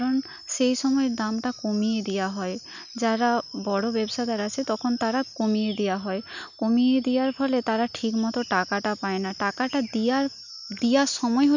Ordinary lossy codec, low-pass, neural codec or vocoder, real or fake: none; 7.2 kHz; none; real